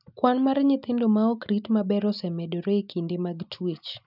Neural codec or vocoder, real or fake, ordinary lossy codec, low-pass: none; real; none; 5.4 kHz